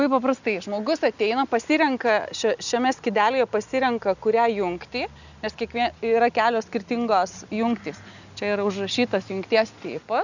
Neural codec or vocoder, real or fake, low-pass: none; real; 7.2 kHz